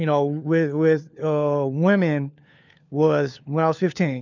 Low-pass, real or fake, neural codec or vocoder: 7.2 kHz; fake; codec, 16 kHz, 4 kbps, FunCodec, trained on LibriTTS, 50 frames a second